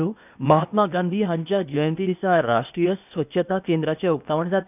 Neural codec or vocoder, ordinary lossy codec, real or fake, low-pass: codec, 16 kHz, 0.8 kbps, ZipCodec; none; fake; 3.6 kHz